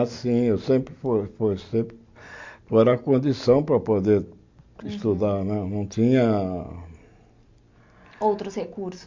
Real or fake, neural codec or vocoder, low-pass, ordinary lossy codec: real; none; 7.2 kHz; MP3, 48 kbps